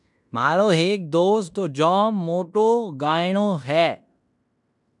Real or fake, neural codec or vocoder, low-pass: fake; codec, 16 kHz in and 24 kHz out, 0.9 kbps, LongCat-Audio-Codec, fine tuned four codebook decoder; 10.8 kHz